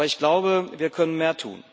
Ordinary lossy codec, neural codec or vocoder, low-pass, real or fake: none; none; none; real